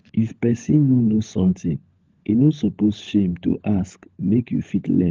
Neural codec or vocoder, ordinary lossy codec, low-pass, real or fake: codec, 16 kHz, 16 kbps, FunCodec, trained on LibriTTS, 50 frames a second; Opus, 32 kbps; 7.2 kHz; fake